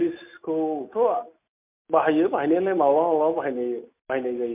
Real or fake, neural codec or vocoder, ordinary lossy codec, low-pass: real; none; MP3, 32 kbps; 3.6 kHz